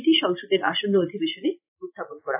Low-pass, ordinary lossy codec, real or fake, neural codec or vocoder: 3.6 kHz; AAC, 32 kbps; real; none